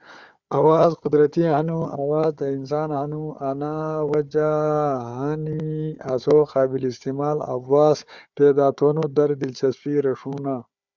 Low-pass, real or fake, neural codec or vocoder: 7.2 kHz; fake; codec, 16 kHz, 4 kbps, FunCodec, trained on Chinese and English, 50 frames a second